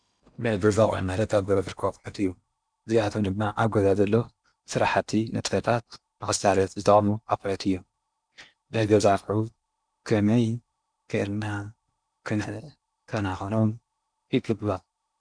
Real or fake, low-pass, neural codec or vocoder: fake; 9.9 kHz; codec, 16 kHz in and 24 kHz out, 0.8 kbps, FocalCodec, streaming, 65536 codes